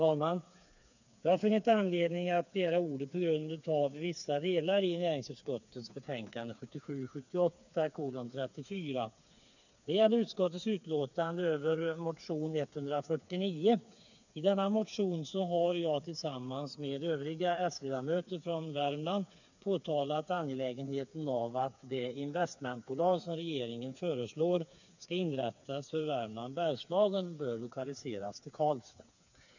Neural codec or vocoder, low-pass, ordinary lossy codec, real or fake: codec, 16 kHz, 4 kbps, FreqCodec, smaller model; 7.2 kHz; none; fake